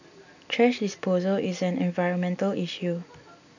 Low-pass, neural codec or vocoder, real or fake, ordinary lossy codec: 7.2 kHz; none; real; none